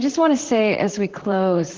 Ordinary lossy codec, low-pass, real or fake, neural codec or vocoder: Opus, 16 kbps; 7.2 kHz; fake; vocoder, 44.1 kHz, 128 mel bands, Pupu-Vocoder